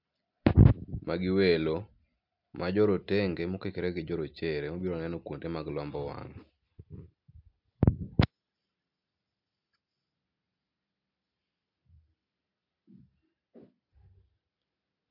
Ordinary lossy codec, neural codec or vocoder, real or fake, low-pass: MP3, 48 kbps; none; real; 5.4 kHz